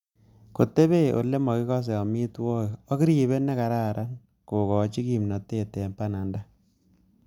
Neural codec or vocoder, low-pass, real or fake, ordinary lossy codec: none; 19.8 kHz; real; none